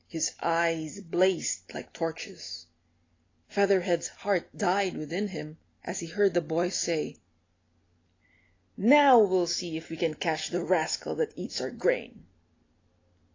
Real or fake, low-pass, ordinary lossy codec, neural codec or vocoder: real; 7.2 kHz; AAC, 32 kbps; none